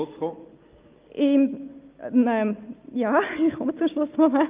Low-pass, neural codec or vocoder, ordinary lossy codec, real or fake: 3.6 kHz; none; Opus, 24 kbps; real